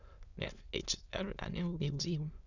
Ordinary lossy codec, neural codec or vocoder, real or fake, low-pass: none; autoencoder, 22.05 kHz, a latent of 192 numbers a frame, VITS, trained on many speakers; fake; 7.2 kHz